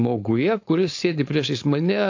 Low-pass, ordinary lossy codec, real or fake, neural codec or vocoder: 7.2 kHz; AAC, 48 kbps; fake; codec, 16 kHz, 4.8 kbps, FACodec